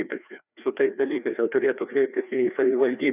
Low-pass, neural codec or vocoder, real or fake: 3.6 kHz; codec, 16 kHz, 2 kbps, FreqCodec, larger model; fake